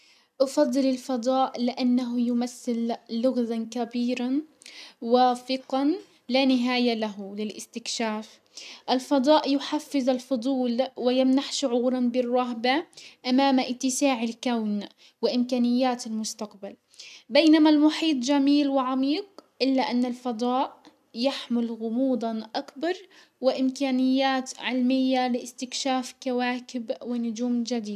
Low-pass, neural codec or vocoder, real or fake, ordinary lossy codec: 14.4 kHz; none; real; none